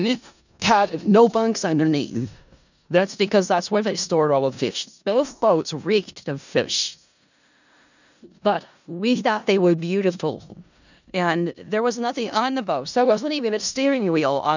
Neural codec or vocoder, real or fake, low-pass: codec, 16 kHz in and 24 kHz out, 0.4 kbps, LongCat-Audio-Codec, four codebook decoder; fake; 7.2 kHz